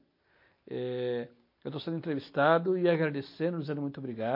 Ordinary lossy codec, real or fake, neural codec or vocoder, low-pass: MP3, 32 kbps; real; none; 5.4 kHz